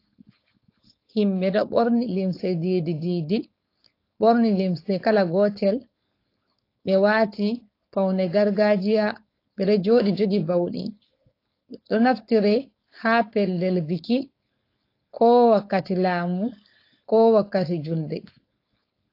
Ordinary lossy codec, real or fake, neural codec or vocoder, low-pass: AAC, 32 kbps; fake; codec, 16 kHz, 4.8 kbps, FACodec; 5.4 kHz